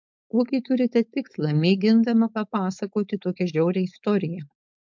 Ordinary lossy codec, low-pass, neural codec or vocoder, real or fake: MP3, 64 kbps; 7.2 kHz; codec, 16 kHz, 4.8 kbps, FACodec; fake